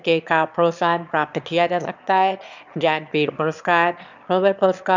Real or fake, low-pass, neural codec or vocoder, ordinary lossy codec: fake; 7.2 kHz; autoencoder, 22.05 kHz, a latent of 192 numbers a frame, VITS, trained on one speaker; none